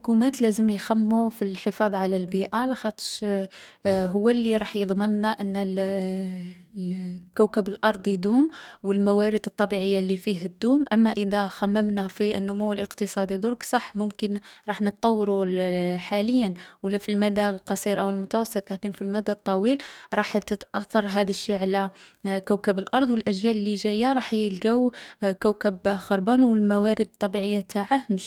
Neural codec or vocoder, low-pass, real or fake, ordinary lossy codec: codec, 44.1 kHz, 2.6 kbps, DAC; 19.8 kHz; fake; none